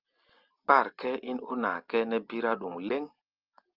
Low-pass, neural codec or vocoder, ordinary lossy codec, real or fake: 5.4 kHz; none; Opus, 24 kbps; real